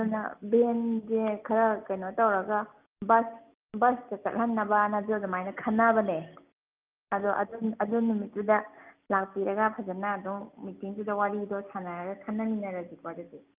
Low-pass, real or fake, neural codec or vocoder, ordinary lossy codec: 3.6 kHz; real; none; Opus, 24 kbps